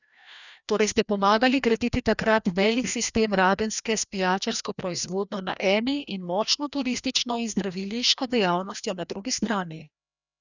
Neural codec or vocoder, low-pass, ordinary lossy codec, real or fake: codec, 16 kHz, 1 kbps, FreqCodec, larger model; 7.2 kHz; none; fake